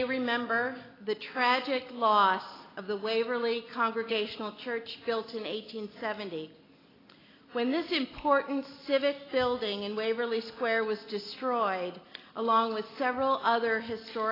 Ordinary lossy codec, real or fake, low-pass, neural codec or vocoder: AAC, 24 kbps; real; 5.4 kHz; none